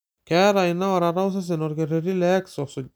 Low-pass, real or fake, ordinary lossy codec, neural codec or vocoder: none; real; none; none